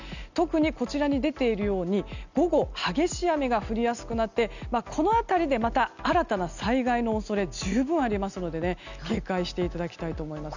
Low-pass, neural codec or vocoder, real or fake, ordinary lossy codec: 7.2 kHz; none; real; none